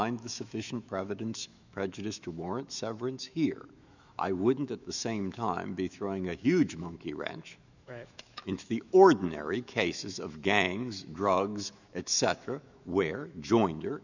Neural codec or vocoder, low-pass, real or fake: autoencoder, 48 kHz, 128 numbers a frame, DAC-VAE, trained on Japanese speech; 7.2 kHz; fake